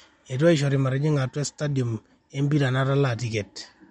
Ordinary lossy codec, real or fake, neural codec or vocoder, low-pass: MP3, 48 kbps; real; none; 19.8 kHz